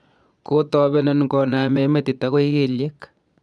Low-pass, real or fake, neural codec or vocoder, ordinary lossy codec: none; fake; vocoder, 22.05 kHz, 80 mel bands, Vocos; none